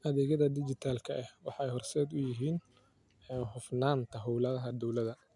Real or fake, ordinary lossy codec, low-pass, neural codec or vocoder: real; MP3, 96 kbps; 10.8 kHz; none